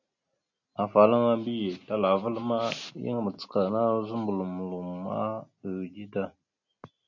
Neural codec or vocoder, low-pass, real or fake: none; 7.2 kHz; real